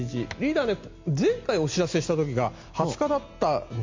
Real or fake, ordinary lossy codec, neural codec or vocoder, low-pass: real; MP3, 48 kbps; none; 7.2 kHz